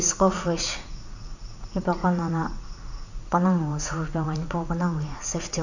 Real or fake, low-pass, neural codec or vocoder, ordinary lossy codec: fake; 7.2 kHz; vocoder, 44.1 kHz, 80 mel bands, Vocos; none